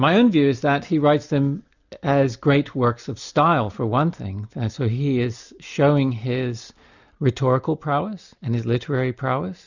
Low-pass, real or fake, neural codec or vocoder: 7.2 kHz; real; none